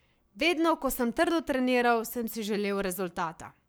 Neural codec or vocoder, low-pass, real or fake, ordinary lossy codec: codec, 44.1 kHz, 7.8 kbps, Pupu-Codec; none; fake; none